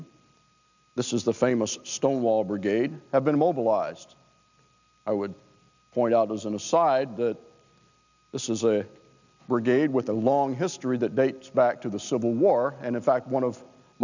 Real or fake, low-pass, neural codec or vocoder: real; 7.2 kHz; none